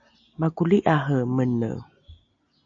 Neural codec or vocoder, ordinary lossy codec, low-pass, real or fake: none; AAC, 48 kbps; 7.2 kHz; real